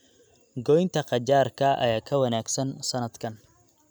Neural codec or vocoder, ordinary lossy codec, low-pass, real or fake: none; none; none; real